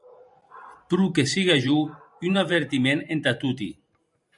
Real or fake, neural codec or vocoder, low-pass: fake; vocoder, 44.1 kHz, 128 mel bands every 512 samples, BigVGAN v2; 10.8 kHz